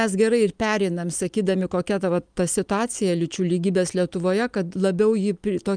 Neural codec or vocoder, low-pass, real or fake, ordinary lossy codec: none; 9.9 kHz; real; Opus, 32 kbps